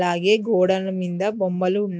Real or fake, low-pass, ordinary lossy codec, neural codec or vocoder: real; none; none; none